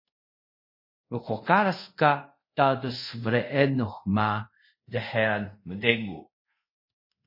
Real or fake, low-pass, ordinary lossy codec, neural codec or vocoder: fake; 5.4 kHz; MP3, 24 kbps; codec, 24 kHz, 0.5 kbps, DualCodec